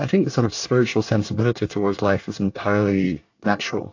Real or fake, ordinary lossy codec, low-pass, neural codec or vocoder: fake; AAC, 48 kbps; 7.2 kHz; codec, 24 kHz, 1 kbps, SNAC